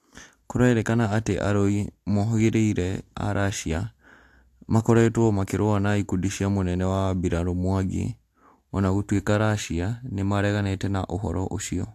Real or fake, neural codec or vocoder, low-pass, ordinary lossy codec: real; none; 14.4 kHz; AAC, 64 kbps